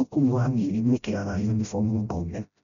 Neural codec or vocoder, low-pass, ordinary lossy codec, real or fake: codec, 16 kHz, 0.5 kbps, FreqCodec, smaller model; 7.2 kHz; MP3, 96 kbps; fake